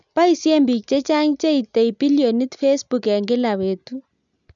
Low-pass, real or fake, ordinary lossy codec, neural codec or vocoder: 7.2 kHz; real; none; none